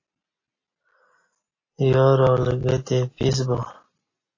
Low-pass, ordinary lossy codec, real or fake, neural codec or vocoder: 7.2 kHz; AAC, 32 kbps; real; none